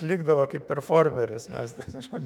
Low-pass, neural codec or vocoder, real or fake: 19.8 kHz; autoencoder, 48 kHz, 32 numbers a frame, DAC-VAE, trained on Japanese speech; fake